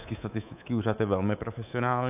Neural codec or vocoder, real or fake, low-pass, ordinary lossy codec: codec, 24 kHz, 3.1 kbps, DualCodec; fake; 3.6 kHz; AAC, 32 kbps